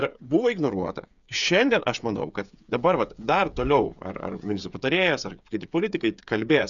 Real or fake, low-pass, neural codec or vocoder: fake; 7.2 kHz; codec, 16 kHz, 8 kbps, FreqCodec, smaller model